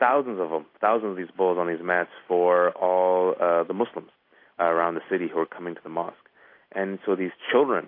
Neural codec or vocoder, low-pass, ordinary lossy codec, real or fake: none; 5.4 kHz; AAC, 32 kbps; real